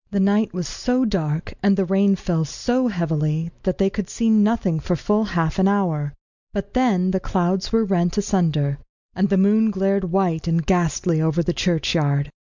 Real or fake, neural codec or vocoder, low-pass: real; none; 7.2 kHz